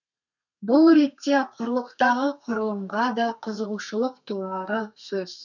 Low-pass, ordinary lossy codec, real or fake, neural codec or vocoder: 7.2 kHz; none; fake; codec, 32 kHz, 1.9 kbps, SNAC